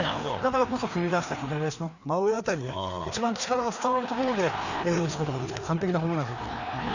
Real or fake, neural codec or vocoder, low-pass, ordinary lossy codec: fake; codec, 16 kHz, 2 kbps, FreqCodec, larger model; 7.2 kHz; none